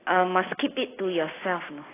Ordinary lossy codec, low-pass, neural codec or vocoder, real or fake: AAC, 16 kbps; 3.6 kHz; none; real